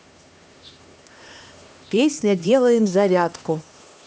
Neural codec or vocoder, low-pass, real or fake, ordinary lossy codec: codec, 16 kHz, 2 kbps, X-Codec, HuBERT features, trained on LibriSpeech; none; fake; none